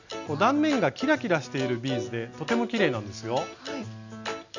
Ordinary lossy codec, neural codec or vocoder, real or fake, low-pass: none; none; real; 7.2 kHz